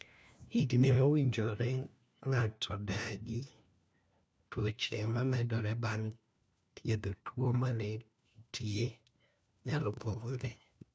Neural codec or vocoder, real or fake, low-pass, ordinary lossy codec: codec, 16 kHz, 1 kbps, FunCodec, trained on LibriTTS, 50 frames a second; fake; none; none